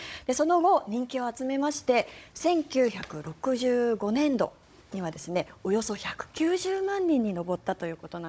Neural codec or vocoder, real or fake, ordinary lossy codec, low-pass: codec, 16 kHz, 16 kbps, FunCodec, trained on Chinese and English, 50 frames a second; fake; none; none